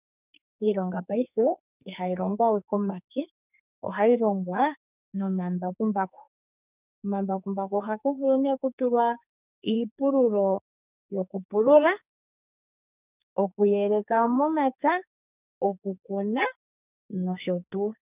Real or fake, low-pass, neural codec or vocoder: fake; 3.6 kHz; codec, 44.1 kHz, 2.6 kbps, SNAC